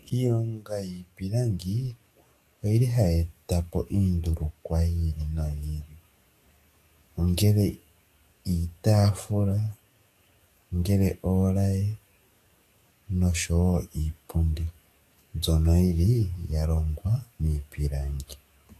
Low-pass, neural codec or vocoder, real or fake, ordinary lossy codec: 14.4 kHz; codec, 44.1 kHz, 7.8 kbps, DAC; fake; AAC, 64 kbps